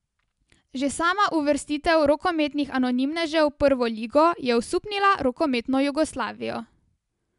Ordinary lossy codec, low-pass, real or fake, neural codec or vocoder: MP3, 96 kbps; 10.8 kHz; real; none